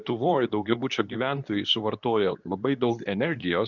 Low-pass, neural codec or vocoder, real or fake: 7.2 kHz; codec, 24 kHz, 0.9 kbps, WavTokenizer, medium speech release version 2; fake